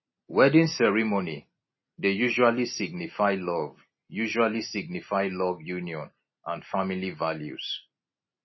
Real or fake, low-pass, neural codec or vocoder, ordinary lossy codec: real; 7.2 kHz; none; MP3, 24 kbps